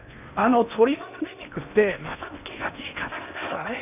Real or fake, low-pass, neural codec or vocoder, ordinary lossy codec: fake; 3.6 kHz; codec, 16 kHz in and 24 kHz out, 0.8 kbps, FocalCodec, streaming, 65536 codes; none